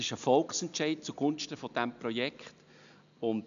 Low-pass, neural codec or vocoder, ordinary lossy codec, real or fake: 7.2 kHz; none; none; real